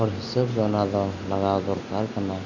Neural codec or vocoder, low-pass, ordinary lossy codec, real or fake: none; 7.2 kHz; none; real